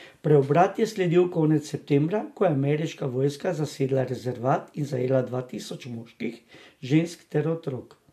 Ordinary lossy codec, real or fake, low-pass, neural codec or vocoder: MP3, 64 kbps; real; 14.4 kHz; none